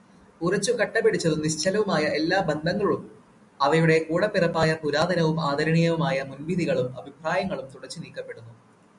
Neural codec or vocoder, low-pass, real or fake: none; 10.8 kHz; real